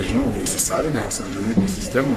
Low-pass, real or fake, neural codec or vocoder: 14.4 kHz; fake; codec, 44.1 kHz, 3.4 kbps, Pupu-Codec